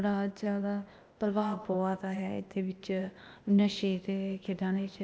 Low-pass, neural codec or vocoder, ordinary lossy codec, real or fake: none; codec, 16 kHz, 0.8 kbps, ZipCodec; none; fake